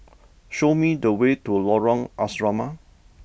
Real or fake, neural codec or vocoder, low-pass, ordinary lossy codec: real; none; none; none